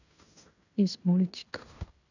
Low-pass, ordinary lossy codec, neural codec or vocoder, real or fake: 7.2 kHz; none; codec, 16 kHz in and 24 kHz out, 0.9 kbps, LongCat-Audio-Codec, fine tuned four codebook decoder; fake